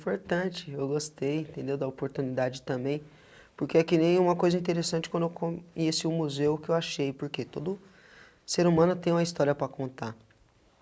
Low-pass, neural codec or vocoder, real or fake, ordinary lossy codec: none; none; real; none